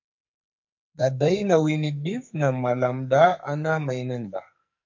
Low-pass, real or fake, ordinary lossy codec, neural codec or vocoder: 7.2 kHz; fake; MP3, 48 kbps; codec, 44.1 kHz, 2.6 kbps, SNAC